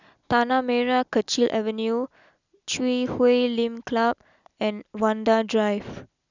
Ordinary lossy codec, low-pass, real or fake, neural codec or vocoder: none; 7.2 kHz; real; none